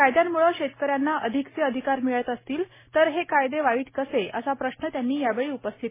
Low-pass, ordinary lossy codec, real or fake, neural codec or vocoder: 3.6 kHz; MP3, 16 kbps; real; none